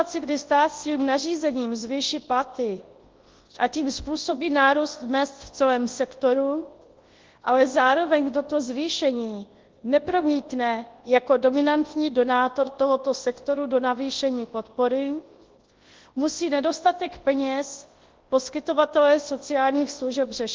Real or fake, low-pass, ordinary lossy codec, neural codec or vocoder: fake; 7.2 kHz; Opus, 16 kbps; codec, 24 kHz, 0.9 kbps, WavTokenizer, large speech release